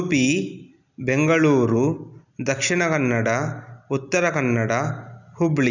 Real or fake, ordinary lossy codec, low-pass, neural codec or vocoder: real; none; 7.2 kHz; none